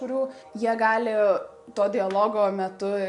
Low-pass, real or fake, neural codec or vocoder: 10.8 kHz; real; none